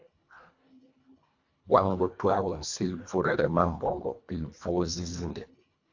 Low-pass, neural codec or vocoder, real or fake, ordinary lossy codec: 7.2 kHz; codec, 24 kHz, 1.5 kbps, HILCodec; fake; AAC, 48 kbps